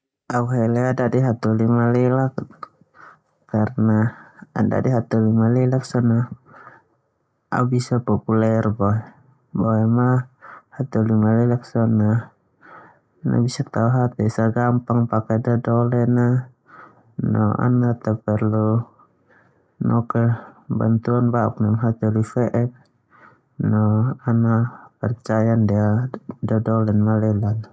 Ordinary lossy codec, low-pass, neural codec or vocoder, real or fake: none; none; none; real